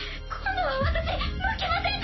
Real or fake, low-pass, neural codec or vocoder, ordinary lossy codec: real; 7.2 kHz; none; MP3, 24 kbps